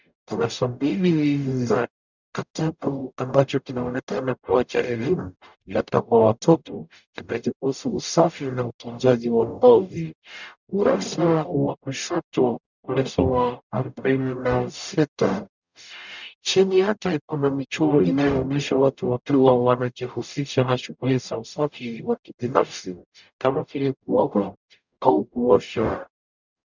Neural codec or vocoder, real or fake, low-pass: codec, 44.1 kHz, 0.9 kbps, DAC; fake; 7.2 kHz